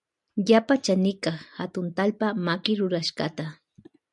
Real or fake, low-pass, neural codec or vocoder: real; 10.8 kHz; none